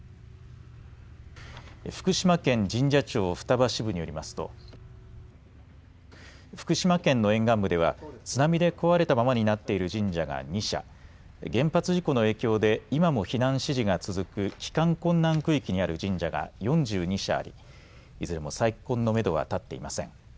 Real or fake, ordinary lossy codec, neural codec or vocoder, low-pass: real; none; none; none